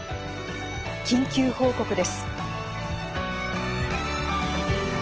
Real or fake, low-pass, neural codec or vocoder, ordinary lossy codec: real; 7.2 kHz; none; Opus, 16 kbps